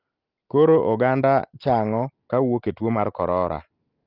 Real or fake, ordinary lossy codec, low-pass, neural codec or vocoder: real; Opus, 24 kbps; 5.4 kHz; none